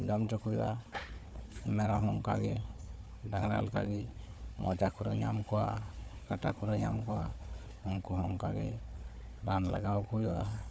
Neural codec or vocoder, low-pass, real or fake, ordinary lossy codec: codec, 16 kHz, 4 kbps, FunCodec, trained on Chinese and English, 50 frames a second; none; fake; none